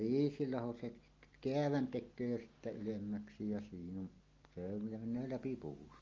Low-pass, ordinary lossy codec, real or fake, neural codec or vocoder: 7.2 kHz; Opus, 32 kbps; real; none